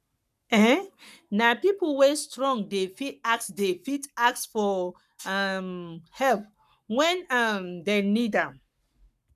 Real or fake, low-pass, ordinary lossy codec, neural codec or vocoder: fake; 14.4 kHz; none; codec, 44.1 kHz, 7.8 kbps, Pupu-Codec